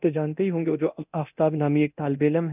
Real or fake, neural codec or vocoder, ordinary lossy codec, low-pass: fake; codec, 24 kHz, 0.9 kbps, DualCodec; none; 3.6 kHz